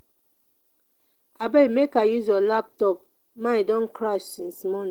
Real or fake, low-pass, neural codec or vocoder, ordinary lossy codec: real; 19.8 kHz; none; Opus, 16 kbps